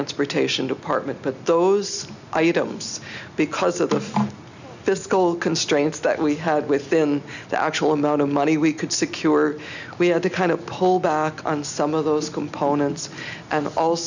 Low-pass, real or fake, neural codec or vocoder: 7.2 kHz; real; none